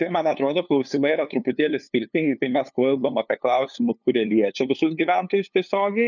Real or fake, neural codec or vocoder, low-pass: fake; codec, 16 kHz, 2 kbps, FunCodec, trained on LibriTTS, 25 frames a second; 7.2 kHz